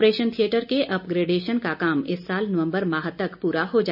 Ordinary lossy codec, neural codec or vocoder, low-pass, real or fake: none; none; 5.4 kHz; real